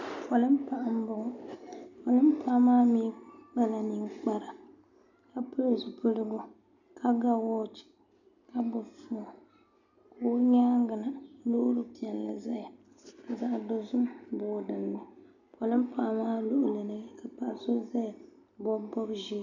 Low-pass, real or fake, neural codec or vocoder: 7.2 kHz; real; none